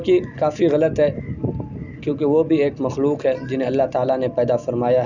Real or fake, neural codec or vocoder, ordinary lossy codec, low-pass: real; none; none; 7.2 kHz